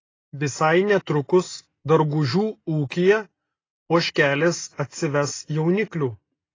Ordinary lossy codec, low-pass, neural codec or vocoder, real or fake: AAC, 32 kbps; 7.2 kHz; none; real